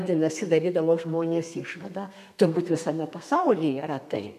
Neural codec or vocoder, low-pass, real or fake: codec, 32 kHz, 1.9 kbps, SNAC; 14.4 kHz; fake